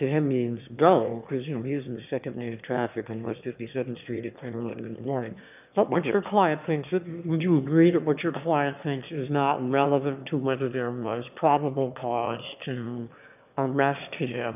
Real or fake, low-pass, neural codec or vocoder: fake; 3.6 kHz; autoencoder, 22.05 kHz, a latent of 192 numbers a frame, VITS, trained on one speaker